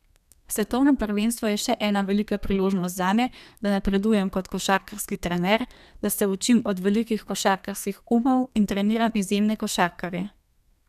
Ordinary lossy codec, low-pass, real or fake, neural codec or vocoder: none; 14.4 kHz; fake; codec, 32 kHz, 1.9 kbps, SNAC